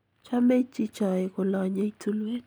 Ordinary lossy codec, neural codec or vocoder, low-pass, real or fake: none; none; none; real